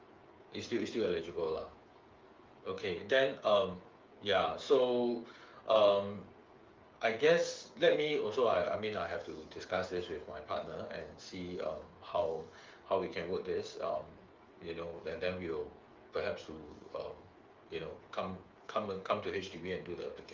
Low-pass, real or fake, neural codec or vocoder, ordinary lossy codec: 7.2 kHz; fake; codec, 16 kHz, 8 kbps, FreqCodec, smaller model; Opus, 32 kbps